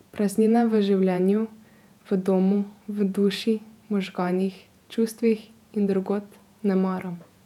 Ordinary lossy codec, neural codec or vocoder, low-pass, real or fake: none; vocoder, 48 kHz, 128 mel bands, Vocos; 19.8 kHz; fake